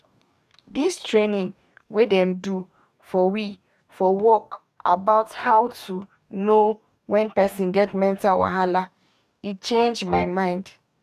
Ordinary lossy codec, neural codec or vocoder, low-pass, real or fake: none; codec, 44.1 kHz, 2.6 kbps, DAC; 14.4 kHz; fake